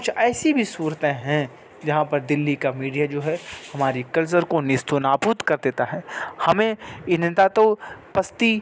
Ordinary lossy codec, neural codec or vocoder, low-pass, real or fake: none; none; none; real